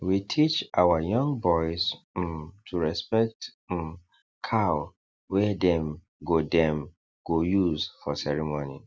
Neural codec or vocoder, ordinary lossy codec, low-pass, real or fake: none; none; none; real